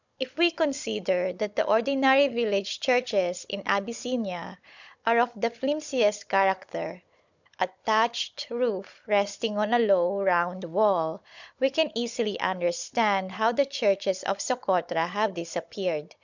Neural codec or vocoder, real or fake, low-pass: codec, 16 kHz, 16 kbps, FunCodec, trained on Chinese and English, 50 frames a second; fake; 7.2 kHz